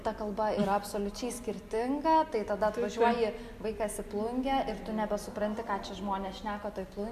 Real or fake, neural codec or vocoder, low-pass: real; none; 14.4 kHz